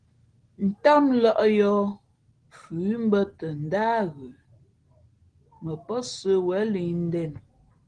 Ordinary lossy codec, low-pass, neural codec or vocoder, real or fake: Opus, 16 kbps; 9.9 kHz; none; real